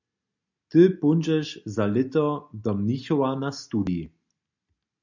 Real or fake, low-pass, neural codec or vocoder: real; 7.2 kHz; none